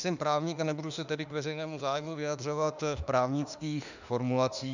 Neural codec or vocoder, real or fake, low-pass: autoencoder, 48 kHz, 32 numbers a frame, DAC-VAE, trained on Japanese speech; fake; 7.2 kHz